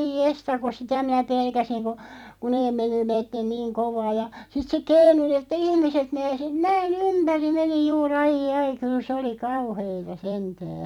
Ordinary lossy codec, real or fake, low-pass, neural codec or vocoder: none; fake; 19.8 kHz; vocoder, 44.1 kHz, 128 mel bands every 256 samples, BigVGAN v2